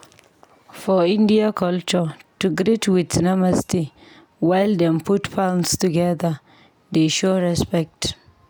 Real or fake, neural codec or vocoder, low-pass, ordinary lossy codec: real; none; none; none